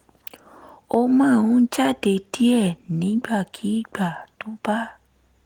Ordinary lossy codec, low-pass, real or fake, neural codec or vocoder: Opus, 24 kbps; 19.8 kHz; real; none